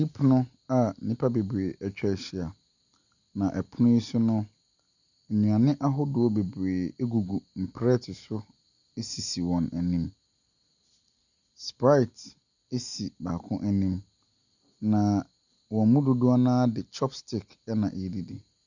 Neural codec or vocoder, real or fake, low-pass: none; real; 7.2 kHz